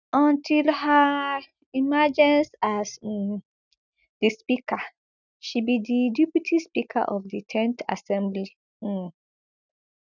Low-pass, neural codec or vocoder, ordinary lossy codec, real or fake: 7.2 kHz; none; none; real